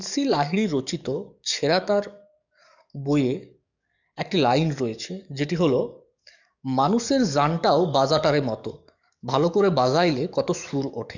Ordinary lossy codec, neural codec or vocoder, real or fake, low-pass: none; codec, 44.1 kHz, 7.8 kbps, DAC; fake; 7.2 kHz